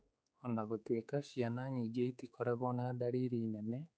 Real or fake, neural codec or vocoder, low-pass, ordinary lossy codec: fake; codec, 16 kHz, 4 kbps, X-Codec, HuBERT features, trained on general audio; none; none